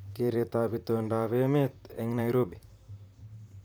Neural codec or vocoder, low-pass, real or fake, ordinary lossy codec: vocoder, 44.1 kHz, 128 mel bands, Pupu-Vocoder; none; fake; none